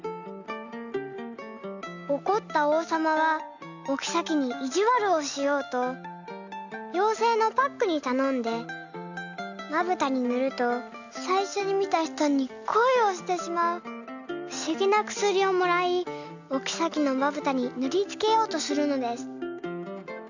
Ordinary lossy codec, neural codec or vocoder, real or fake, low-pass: none; none; real; 7.2 kHz